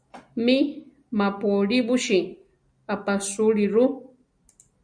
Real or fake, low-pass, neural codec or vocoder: real; 9.9 kHz; none